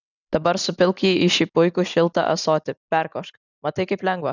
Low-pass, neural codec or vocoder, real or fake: 7.2 kHz; none; real